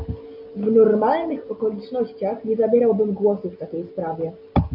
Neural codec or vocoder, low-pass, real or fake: none; 5.4 kHz; real